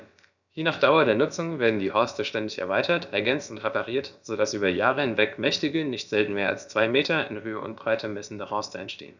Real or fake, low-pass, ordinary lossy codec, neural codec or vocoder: fake; 7.2 kHz; none; codec, 16 kHz, about 1 kbps, DyCAST, with the encoder's durations